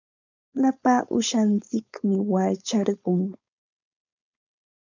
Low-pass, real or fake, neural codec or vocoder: 7.2 kHz; fake; codec, 16 kHz, 4.8 kbps, FACodec